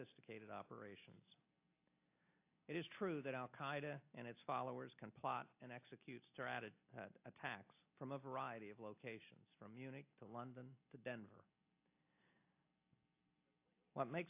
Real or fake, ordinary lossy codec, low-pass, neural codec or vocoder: real; AAC, 32 kbps; 3.6 kHz; none